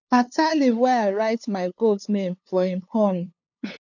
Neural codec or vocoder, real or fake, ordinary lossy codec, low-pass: codec, 16 kHz, 2 kbps, FunCodec, trained on LibriTTS, 25 frames a second; fake; none; 7.2 kHz